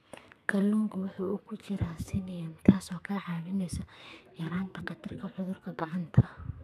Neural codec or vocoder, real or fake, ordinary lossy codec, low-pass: codec, 32 kHz, 1.9 kbps, SNAC; fake; none; 14.4 kHz